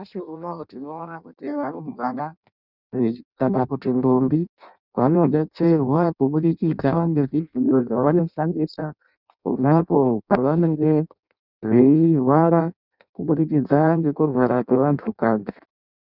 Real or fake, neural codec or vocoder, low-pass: fake; codec, 16 kHz in and 24 kHz out, 0.6 kbps, FireRedTTS-2 codec; 5.4 kHz